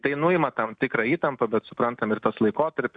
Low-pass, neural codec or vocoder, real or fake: 9.9 kHz; none; real